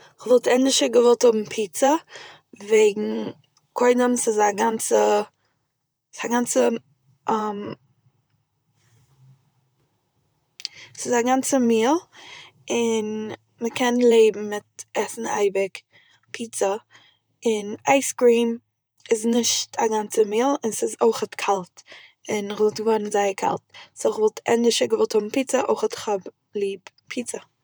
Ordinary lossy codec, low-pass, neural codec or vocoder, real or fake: none; none; vocoder, 44.1 kHz, 128 mel bands, Pupu-Vocoder; fake